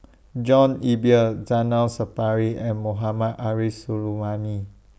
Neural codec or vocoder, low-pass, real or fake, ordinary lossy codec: none; none; real; none